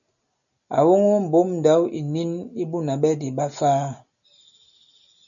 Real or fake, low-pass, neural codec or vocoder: real; 7.2 kHz; none